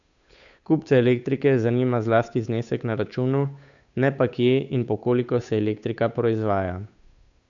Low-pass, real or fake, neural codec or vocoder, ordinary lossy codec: 7.2 kHz; fake; codec, 16 kHz, 8 kbps, FunCodec, trained on Chinese and English, 25 frames a second; none